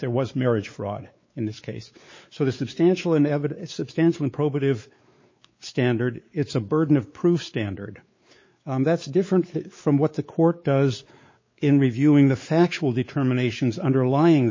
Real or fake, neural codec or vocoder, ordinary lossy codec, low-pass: fake; codec, 16 kHz, 4 kbps, X-Codec, WavLM features, trained on Multilingual LibriSpeech; MP3, 32 kbps; 7.2 kHz